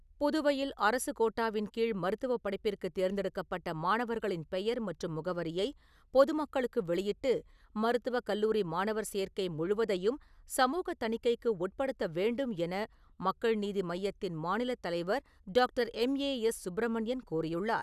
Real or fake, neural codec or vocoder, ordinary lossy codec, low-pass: real; none; none; 14.4 kHz